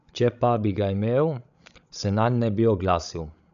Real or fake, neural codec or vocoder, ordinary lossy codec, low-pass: fake; codec, 16 kHz, 16 kbps, FreqCodec, larger model; none; 7.2 kHz